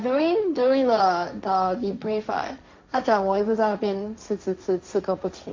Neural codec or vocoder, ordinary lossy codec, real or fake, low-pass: codec, 16 kHz, 1.1 kbps, Voila-Tokenizer; MP3, 48 kbps; fake; 7.2 kHz